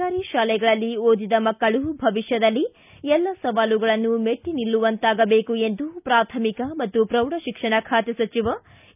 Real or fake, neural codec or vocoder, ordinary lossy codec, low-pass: real; none; none; 3.6 kHz